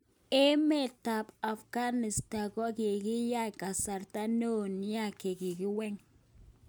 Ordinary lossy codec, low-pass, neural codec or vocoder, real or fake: none; none; none; real